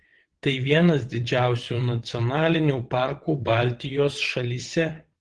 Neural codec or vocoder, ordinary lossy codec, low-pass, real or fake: vocoder, 22.05 kHz, 80 mel bands, WaveNeXt; Opus, 16 kbps; 9.9 kHz; fake